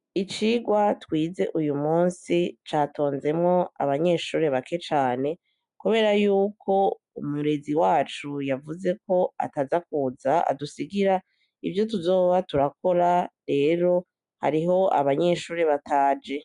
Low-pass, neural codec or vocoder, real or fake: 10.8 kHz; none; real